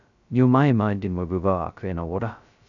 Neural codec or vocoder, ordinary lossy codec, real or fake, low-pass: codec, 16 kHz, 0.2 kbps, FocalCodec; none; fake; 7.2 kHz